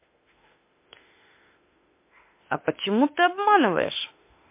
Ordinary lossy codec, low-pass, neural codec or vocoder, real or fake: MP3, 24 kbps; 3.6 kHz; autoencoder, 48 kHz, 32 numbers a frame, DAC-VAE, trained on Japanese speech; fake